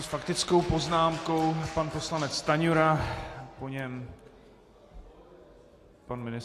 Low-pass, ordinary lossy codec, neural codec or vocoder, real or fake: 14.4 kHz; AAC, 48 kbps; none; real